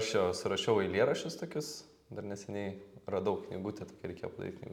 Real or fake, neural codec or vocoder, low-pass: real; none; 19.8 kHz